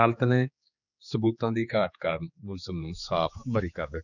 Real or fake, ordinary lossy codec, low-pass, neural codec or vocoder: fake; none; 7.2 kHz; codec, 16 kHz, 4 kbps, X-Codec, HuBERT features, trained on general audio